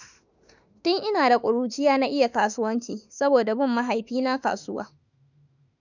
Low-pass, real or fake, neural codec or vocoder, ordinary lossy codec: 7.2 kHz; fake; autoencoder, 48 kHz, 32 numbers a frame, DAC-VAE, trained on Japanese speech; none